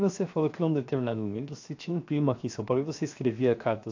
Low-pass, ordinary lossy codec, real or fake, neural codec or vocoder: 7.2 kHz; AAC, 48 kbps; fake; codec, 16 kHz, 0.7 kbps, FocalCodec